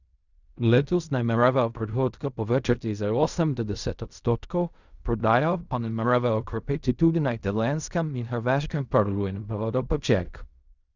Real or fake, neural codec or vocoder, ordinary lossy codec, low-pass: fake; codec, 16 kHz in and 24 kHz out, 0.4 kbps, LongCat-Audio-Codec, fine tuned four codebook decoder; none; 7.2 kHz